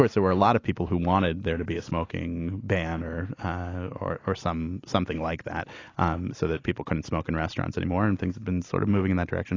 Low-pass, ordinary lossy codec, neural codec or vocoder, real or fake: 7.2 kHz; AAC, 32 kbps; none; real